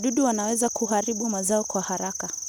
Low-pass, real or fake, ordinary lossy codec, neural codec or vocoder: none; real; none; none